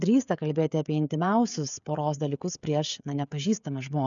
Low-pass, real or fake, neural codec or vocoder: 7.2 kHz; fake; codec, 16 kHz, 16 kbps, FreqCodec, smaller model